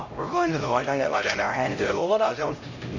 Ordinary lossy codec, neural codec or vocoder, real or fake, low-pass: AAC, 48 kbps; codec, 16 kHz, 0.5 kbps, X-Codec, HuBERT features, trained on LibriSpeech; fake; 7.2 kHz